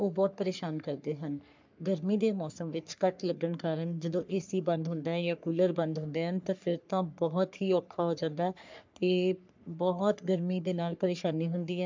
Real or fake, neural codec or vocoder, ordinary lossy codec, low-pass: fake; codec, 44.1 kHz, 3.4 kbps, Pupu-Codec; MP3, 64 kbps; 7.2 kHz